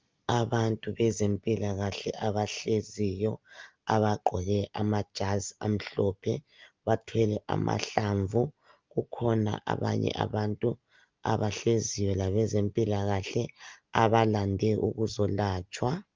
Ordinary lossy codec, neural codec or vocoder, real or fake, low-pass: Opus, 32 kbps; none; real; 7.2 kHz